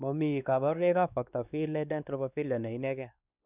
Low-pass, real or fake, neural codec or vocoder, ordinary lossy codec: 3.6 kHz; fake; codec, 16 kHz, 2 kbps, X-Codec, WavLM features, trained on Multilingual LibriSpeech; none